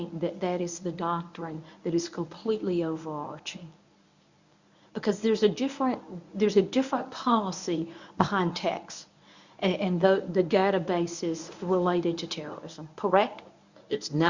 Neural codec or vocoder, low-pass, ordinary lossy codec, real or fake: codec, 24 kHz, 0.9 kbps, WavTokenizer, medium speech release version 1; 7.2 kHz; Opus, 64 kbps; fake